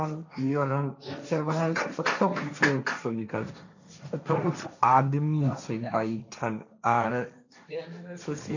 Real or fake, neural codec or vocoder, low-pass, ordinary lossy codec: fake; codec, 16 kHz, 1.1 kbps, Voila-Tokenizer; 7.2 kHz; none